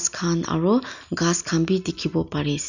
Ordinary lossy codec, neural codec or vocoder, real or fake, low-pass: none; none; real; 7.2 kHz